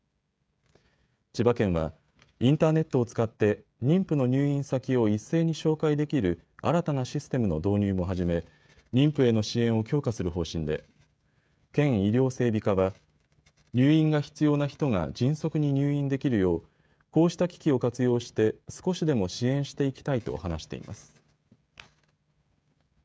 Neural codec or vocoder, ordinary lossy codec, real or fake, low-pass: codec, 16 kHz, 16 kbps, FreqCodec, smaller model; none; fake; none